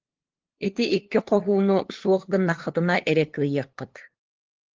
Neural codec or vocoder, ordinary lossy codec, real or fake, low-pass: codec, 16 kHz, 2 kbps, FunCodec, trained on LibriTTS, 25 frames a second; Opus, 16 kbps; fake; 7.2 kHz